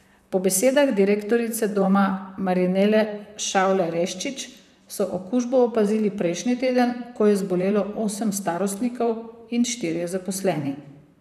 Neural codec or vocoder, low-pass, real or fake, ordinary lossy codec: vocoder, 44.1 kHz, 128 mel bands, Pupu-Vocoder; 14.4 kHz; fake; none